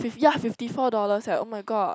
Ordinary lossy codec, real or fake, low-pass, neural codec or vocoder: none; real; none; none